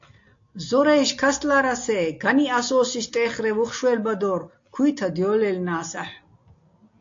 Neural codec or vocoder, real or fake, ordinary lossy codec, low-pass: none; real; AAC, 48 kbps; 7.2 kHz